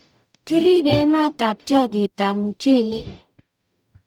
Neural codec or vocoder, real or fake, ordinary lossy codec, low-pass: codec, 44.1 kHz, 0.9 kbps, DAC; fake; none; 19.8 kHz